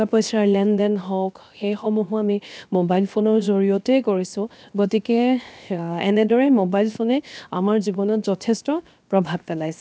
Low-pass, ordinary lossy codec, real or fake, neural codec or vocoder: none; none; fake; codec, 16 kHz, 0.7 kbps, FocalCodec